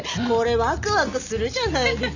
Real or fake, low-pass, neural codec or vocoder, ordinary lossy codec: real; 7.2 kHz; none; none